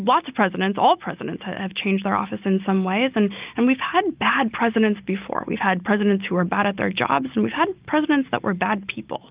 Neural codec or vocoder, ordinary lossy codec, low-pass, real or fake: none; Opus, 24 kbps; 3.6 kHz; real